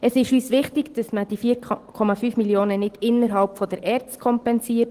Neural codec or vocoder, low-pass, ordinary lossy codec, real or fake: none; 14.4 kHz; Opus, 16 kbps; real